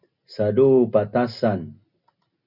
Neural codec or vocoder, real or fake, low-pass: none; real; 5.4 kHz